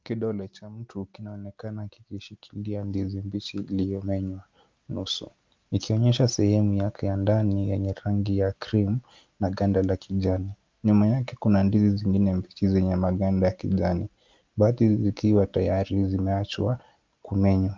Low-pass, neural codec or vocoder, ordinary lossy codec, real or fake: 7.2 kHz; none; Opus, 24 kbps; real